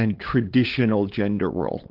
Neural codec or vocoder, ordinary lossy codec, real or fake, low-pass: codec, 16 kHz, 8 kbps, FunCodec, trained on LibriTTS, 25 frames a second; Opus, 16 kbps; fake; 5.4 kHz